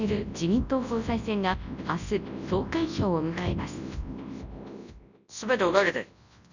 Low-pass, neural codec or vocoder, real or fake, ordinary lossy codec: 7.2 kHz; codec, 24 kHz, 0.9 kbps, WavTokenizer, large speech release; fake; none